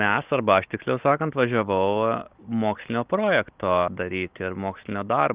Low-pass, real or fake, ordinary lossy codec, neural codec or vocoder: 3.6 kHz; real; Opus, 32 kbps; none